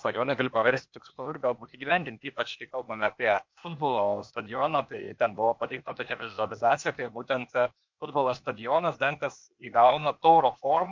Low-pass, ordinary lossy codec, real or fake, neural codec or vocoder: 7.2 kHz; MP3, 48 kbps; fake; codec, 16 kHz, 0.8 kbps, ZipCodec